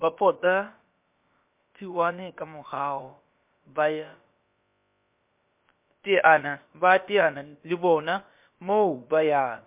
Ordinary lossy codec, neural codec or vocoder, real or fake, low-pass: MP3, 32 kbps; codec, 16 kHz, about 1 kbps, DyCAST, with the encoder's durations; fake; 3.6 kHz